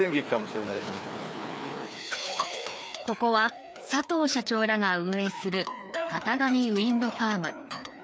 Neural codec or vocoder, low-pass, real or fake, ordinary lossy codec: codec, 16 kHz, 2 kbps, FreqCodec, larger model; none; fake; none